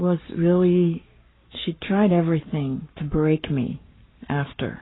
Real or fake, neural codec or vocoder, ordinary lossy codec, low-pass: fake; codec, 44.1 kHz, 7.8 kbps, Pupu-Codec; AAC, 16 kbps; 7.2 kHz